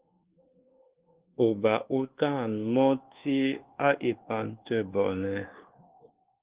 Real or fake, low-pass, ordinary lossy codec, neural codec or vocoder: fake; 3.6 kHz; Opus, 16 kbps; codec, 24 kHz, 1.2 kbps, DualCodec